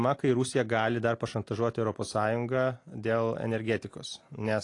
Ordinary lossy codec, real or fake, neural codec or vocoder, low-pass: AAC, 48 kbps; real; none; 10.8 kHz